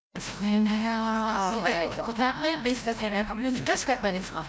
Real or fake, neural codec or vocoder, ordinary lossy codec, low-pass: fake; codec, 16 kHz, 0.5 kbps, FreqCodec, larger model; none; none